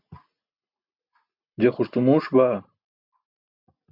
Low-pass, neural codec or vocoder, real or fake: 5.4 kHz; none; real